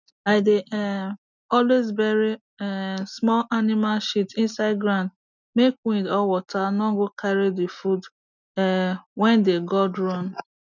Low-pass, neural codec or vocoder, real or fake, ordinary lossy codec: 7.2 kHz; none; real; none